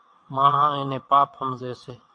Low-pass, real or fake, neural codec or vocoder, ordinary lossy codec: 9.9 kHz; fake; vocoder, 22.05 kHz, 80 mel bands, WaveNeXt; MP3, 64 kbps